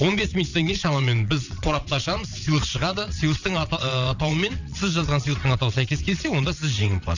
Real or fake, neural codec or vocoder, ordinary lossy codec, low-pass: fake; vocoder, 22.05 kHz, 80 mel bands, WaveNeXt; none; 7.2 kHz